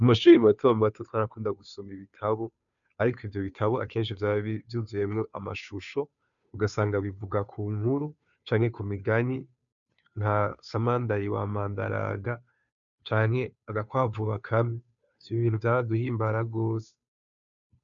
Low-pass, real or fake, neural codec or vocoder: 7.2 kHz; fake; codec, 16 kHz, 2 kbps, FunCodec, trained on Chinese and English, 25 frames a second